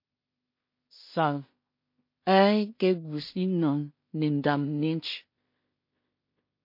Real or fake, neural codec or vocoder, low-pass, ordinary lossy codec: fake; codec, 16 kHz in and 24 kHz out, 0.4 kbps, LongCat-Audio-Codec, two codebook decoder; 5.4 kHz; MP3, 32 kbps